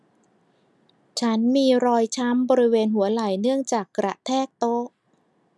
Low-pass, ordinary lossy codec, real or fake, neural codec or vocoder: none; none; real; none